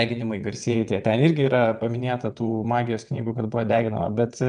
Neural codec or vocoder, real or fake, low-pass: vocoder, 22.05 kHz, 80 mel bands, WaveNeXt; fake; 9.9 kHz